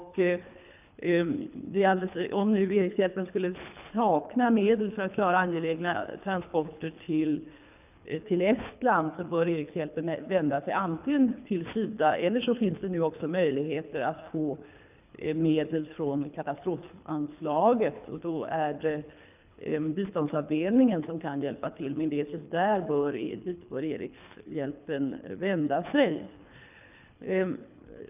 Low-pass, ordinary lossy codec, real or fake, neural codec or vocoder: 3.6 kHz; none; fake; codec, 24 kHz, 3 kbps, HILCodec